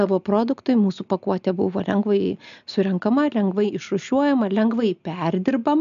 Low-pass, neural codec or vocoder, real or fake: 7.2 kHz; none; real